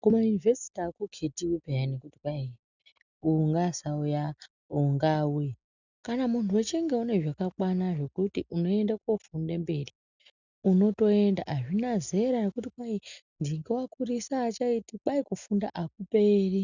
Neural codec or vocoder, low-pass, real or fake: none; 7.2 kHz; real